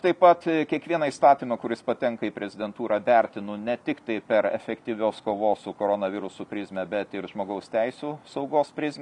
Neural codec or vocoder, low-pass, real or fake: none; 10.8 kHz; real